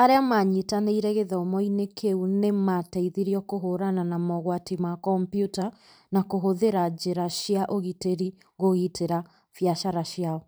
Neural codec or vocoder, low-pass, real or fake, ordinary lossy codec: vocoder, 44.1 kHz, 128 mel bands every 512 samples, BigVGAN v2; none; fake; none